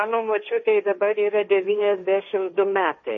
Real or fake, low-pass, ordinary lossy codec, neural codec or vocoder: fake; 7.2 kHz; MP3, 32 kbps; codec, 16 kHz, 1.1 kbps, Voila-Tokenizer